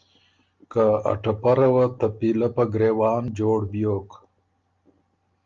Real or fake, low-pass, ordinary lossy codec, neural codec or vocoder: real; 7.2 kHz; Opus, 32 kbps; none